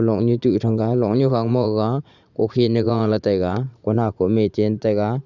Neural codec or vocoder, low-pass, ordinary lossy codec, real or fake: vocoder, 44.1 kHz, 80 mel bands, Vocos; 7.2 kHz; none; fake